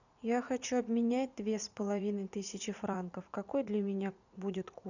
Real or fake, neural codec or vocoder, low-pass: fake; vocoder, 44.1 kHz, 80 mel bands, Vocos; 7.2 kHz